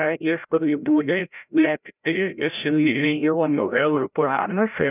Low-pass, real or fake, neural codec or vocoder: 3.6 kHz; fake; codec, 16 kHz, 0.5 kbps, FreqCodec, larger model